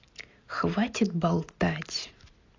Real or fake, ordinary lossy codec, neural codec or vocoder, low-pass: real; AAC, 32 kbps; none; 7.2 kHz